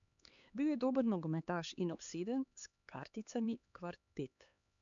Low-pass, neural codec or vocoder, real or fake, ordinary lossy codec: 7.2 kHz; codec, 16 kHz, 4 kbps, X-Codec, HuBERT features, trained on LibriSpeech; fake; none